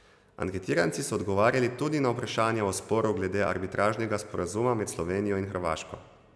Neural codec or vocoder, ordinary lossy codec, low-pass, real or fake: none; none; none; real